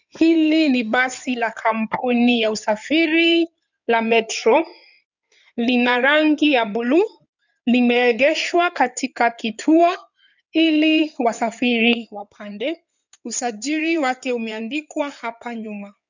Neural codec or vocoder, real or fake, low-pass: codec, 16 kHz in and 24 kHz out, 2.2 kbps, FireRedTTS-2 codec; fake; 7.2 kHz